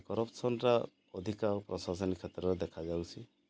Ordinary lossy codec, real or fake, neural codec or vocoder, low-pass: none; real; none; none